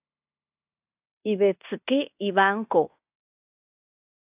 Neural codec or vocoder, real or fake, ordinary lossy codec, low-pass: codec, 16 kHz in and 24 kHz out, 0.9 kbps, LongCat-Audio-Codec, fine tuned four codebook decoder; fake; AAC, 32 kbps; 3.6 kHz